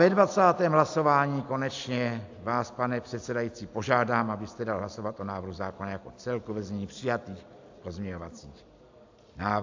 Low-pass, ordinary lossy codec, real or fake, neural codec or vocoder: 7.2 kHz; AAC, 48 kbps; real; none